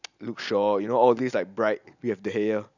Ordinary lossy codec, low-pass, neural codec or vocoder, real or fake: none; 7.2 kHz; none; real